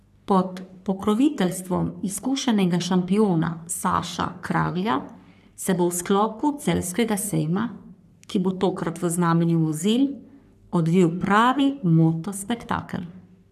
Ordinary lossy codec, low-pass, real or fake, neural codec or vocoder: none; 14.4 kHz; fake; codec, 44.1 kHz, 3.4 kbps, Pupu-Codec